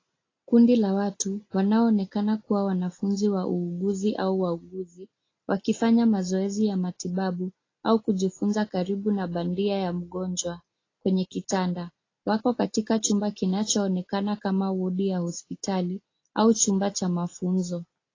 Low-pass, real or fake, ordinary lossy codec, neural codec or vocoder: 7.2 kHz; real; AAC, 32 kbps; none